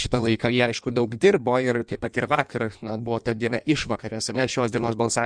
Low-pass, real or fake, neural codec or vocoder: 9.9 kHz; fake; codec, 16 kHz in and 24 kHz out, 1.1 kbps, FireRedTTS-2 codec